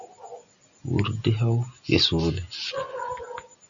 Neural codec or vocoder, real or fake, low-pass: none; real; 7.2 kHz